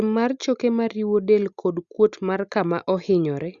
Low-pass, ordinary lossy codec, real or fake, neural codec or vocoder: 7.2 kHz; Opus, 64 kbps; real; none